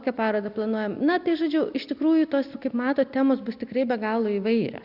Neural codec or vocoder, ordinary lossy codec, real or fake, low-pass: none; Opus, 64 kbps; real; 5.4 kHz